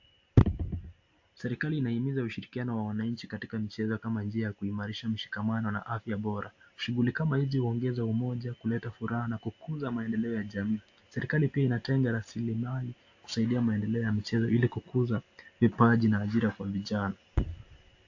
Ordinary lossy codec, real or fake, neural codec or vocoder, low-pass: AAC, 48 kbps; real; none; 7.2 kHz